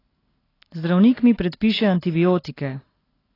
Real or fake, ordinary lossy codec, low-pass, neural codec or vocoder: real; AAC, 24 kbps; 5.4 kHz; none